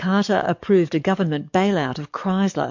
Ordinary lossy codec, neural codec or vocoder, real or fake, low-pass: MP3, 48 kbps; codec, 44.1 kHz, 7.8 kbps, DAC; fake; 7.2 kHz